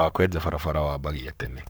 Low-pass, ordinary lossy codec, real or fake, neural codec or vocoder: none; none; fake; codec, 44.1 kHz, 7.8 kbps, Pupu-Codec